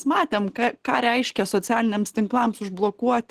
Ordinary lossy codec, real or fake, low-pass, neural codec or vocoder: Opus, 16 kbps; real; 14.4 kHz; none